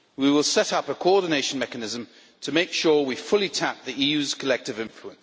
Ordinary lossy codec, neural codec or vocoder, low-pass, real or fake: none; none; none; real